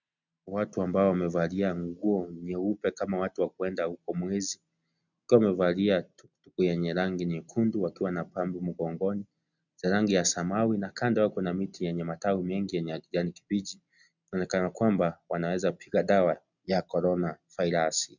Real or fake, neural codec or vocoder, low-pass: real; none; 7.2 kHz